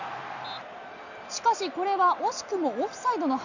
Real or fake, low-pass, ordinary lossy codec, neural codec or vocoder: real; 7.2 kHz; none; none